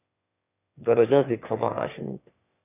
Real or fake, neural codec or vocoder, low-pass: fake; autoencoder, 22.05 kHz, a latent of 192 numbers a frame, VITS, trained on one speaker; 3.6 kHz